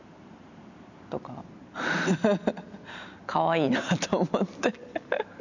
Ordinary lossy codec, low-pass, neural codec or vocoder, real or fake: none; 7.2 kHz; none; real